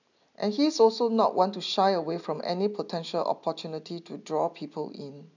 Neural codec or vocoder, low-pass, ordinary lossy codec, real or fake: none; 7.2 kHz; none; real